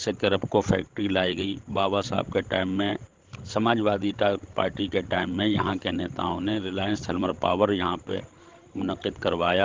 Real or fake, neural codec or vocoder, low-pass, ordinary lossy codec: fake; codec, 16 kHz, 16 kbps, FreqCodec, larger model; 7.2 kHz; Opus, 16 kbps